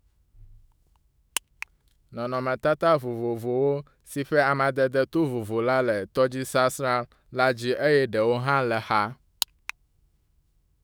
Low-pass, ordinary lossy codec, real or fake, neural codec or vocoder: none; none; fake; autoencoder, 48 kHz, 128 numbers a frame, DAC-VAE, trained on Japanese speech